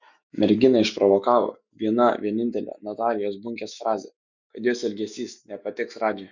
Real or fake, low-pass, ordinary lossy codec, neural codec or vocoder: fake; 7.2 kHz; Opus, 64 kbps; autoencoder, 48 kHz, 128 numbers a frame, DAC-VAE, trained on Japanese speech